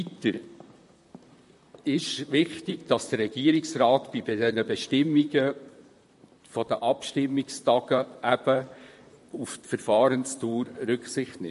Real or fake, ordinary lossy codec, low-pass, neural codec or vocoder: fake; MP3, 48 kbps; 14.4 kHz; vocoder, 44.1 kHz, 128 mel bands, Pupu-Vocoder